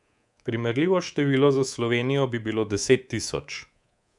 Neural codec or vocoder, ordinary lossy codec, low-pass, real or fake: codec, 24 kHz, 3.1 kbps, DualCodec; none; 10.8 kHz; fake